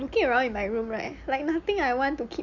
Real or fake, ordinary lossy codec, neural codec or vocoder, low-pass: real; none; none; 7.2 kHz